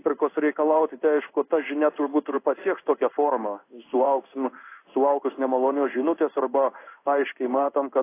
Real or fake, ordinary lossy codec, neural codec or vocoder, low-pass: fake; AAC, 24 kbps; codec, 16 kHz in and 24 kHz out, 1 kbps, XY-Tokenizer; 3.6 kHz